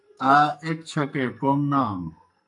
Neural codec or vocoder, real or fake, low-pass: codec, 44.1 kHz, 2.6 kbps, SNAC; fake; 10.8 kHz